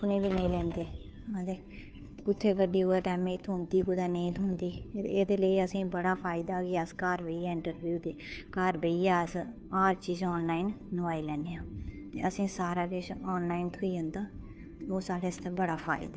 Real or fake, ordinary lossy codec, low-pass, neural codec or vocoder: fake; none; none; codec, 16 kHz, 2 kbps, FunCodec, trained on Chinese and English, 25 frames a second